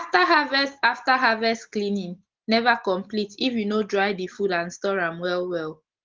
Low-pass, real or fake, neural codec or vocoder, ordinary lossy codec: 7.2 kHz; real; none; Opus, 16 kbps